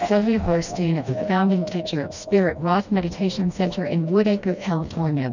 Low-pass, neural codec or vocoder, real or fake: 7.2 kHz; codec, 16 kHz, 1 kbps, FreqCodec, smaller model; fake